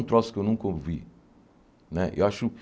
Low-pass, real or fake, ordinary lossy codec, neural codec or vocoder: none; real; none; none